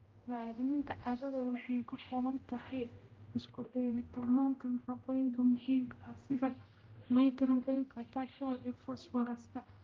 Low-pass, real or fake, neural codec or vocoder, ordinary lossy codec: 7.2 kHz; fake; codec, 16 kHz, 0.5 kbps, X-Codec, HuBERT features, trained on general audio; Opus, 32 kbps